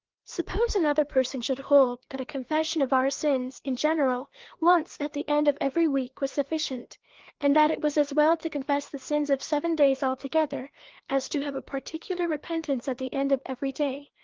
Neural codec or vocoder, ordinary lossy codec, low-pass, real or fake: codec, 16 kHz, 2 kbps, FreqCodec, larger model; Opus, 16 kbps; 7.2 kHz; fake